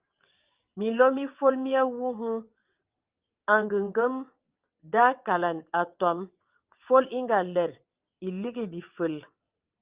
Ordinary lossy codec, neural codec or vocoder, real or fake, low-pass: Opus, 24 kbps; vocoder, 22.05 kHz, 80 mel bands, WaveNeXt; fake; 3.6 kHz